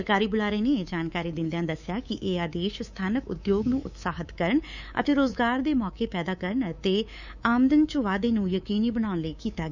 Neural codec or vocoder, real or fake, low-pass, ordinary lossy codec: codec, 24 kHz, 3.1 kbps, DualCodec; fake; 7.2 kHz; none